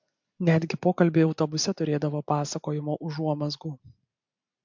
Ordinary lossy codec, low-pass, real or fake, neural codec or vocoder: MP3, 64 kbps; 7.2 kHz; real; none